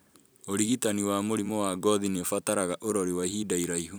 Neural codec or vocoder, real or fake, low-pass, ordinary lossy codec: vocoder, 44.1 kHz, 128 mel bands every 256 samples, BigVGAN v2; fake; none; none